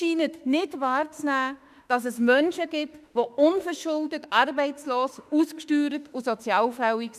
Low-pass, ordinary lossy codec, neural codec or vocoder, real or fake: 14.4 kHz; none; autoencoder, 48 kHz, 32 numbers a frame, DAC-VAE, trained on Japanese speech; fake